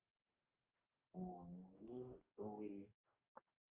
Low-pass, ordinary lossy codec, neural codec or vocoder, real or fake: 3.6 kHz; Opus, 24 kbps; codec, 44.1 kHz, 2.6 kbps, DAC; fake